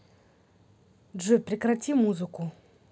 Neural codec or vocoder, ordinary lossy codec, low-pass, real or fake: none; none; none; real